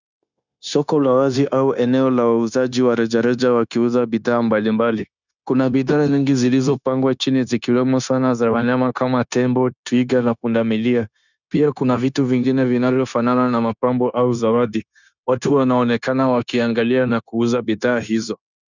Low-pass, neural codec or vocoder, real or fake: 7.2 kHz; codec, 16 kHz, 0.9 kbps, LongCat-Audio-Codec; fake